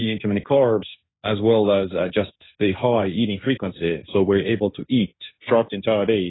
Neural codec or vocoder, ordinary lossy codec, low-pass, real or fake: codec, 16 kHz, 1.1 kbps, Voila-Tokenizer; AAC, 16 kbps; 7.2 kHz; fake